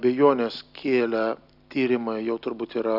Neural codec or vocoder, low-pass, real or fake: none; 5.4 kHz; real